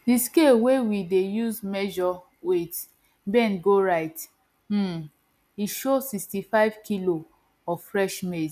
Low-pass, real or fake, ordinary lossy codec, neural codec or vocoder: 14.4 kHz; real; none; none